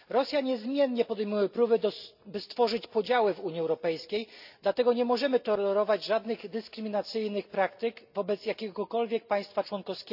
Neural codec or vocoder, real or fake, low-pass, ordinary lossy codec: none; real; 5.4 kHz; none